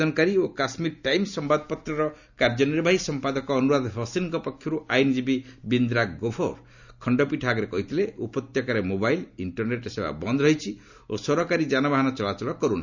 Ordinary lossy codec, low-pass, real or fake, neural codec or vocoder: none; 7.2 kHz; real; none